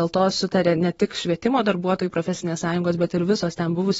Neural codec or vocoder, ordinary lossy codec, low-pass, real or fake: vocoder, 44.1 kHz, 128 mel bands every 512 samples, BigVGAN v2; AAC, 24 kbps; 19.8 kHz; fake